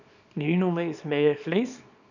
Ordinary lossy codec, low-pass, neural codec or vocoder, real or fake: none; 7.2 kHz; codec, 24 kHz, 0.9 kbps, WavTokenizer, small release; fake